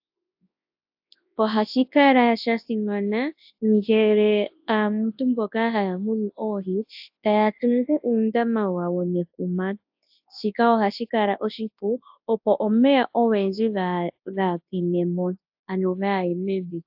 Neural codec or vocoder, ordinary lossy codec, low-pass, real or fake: codec, 24 kHz, 0.9 kbps, WavTokenizer, large speech release; AAC, 48 kbps; 5.4 kHz; fake